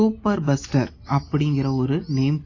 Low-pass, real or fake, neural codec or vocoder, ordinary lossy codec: 7.2 kHz; real; none; AAC, 32 kbps